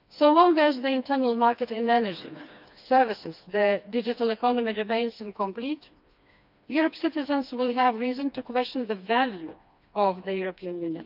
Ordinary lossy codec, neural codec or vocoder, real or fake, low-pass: none; codec, 16 kHz, 2 kbps, FreqCodec, smaller model; fake; 5.4 kHz